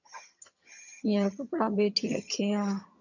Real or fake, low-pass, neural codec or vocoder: fake; 7.2 kHz; vocoder, 22.05 kHz, 80 mel bands, HiFi-GAN